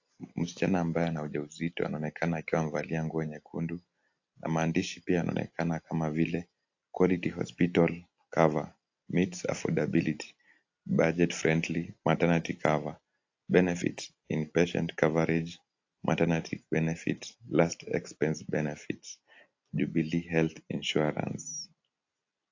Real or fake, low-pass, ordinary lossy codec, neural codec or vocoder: real; 7.2 kHz; AAC, 48 kbps; none